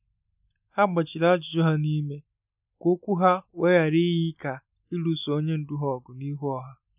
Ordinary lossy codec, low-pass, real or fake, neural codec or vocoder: none; 3.6 kHz; real; none